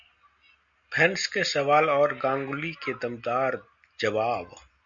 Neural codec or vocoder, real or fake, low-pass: none; real; 7.2 kHz